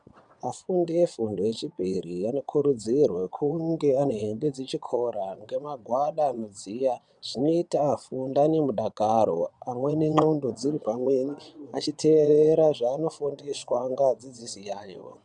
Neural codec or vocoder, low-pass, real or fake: vocoder, 22.05 kHz, 80 mel bands, WaveNeXt; 9.9 kHz; fake